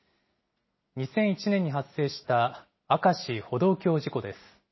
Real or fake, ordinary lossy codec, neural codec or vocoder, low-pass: real; MP3, 24 kbps; none; 7.2 kHz